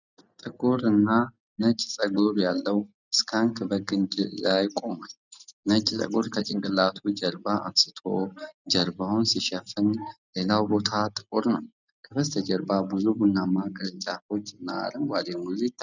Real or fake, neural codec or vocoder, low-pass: real; none; 7.2 kHz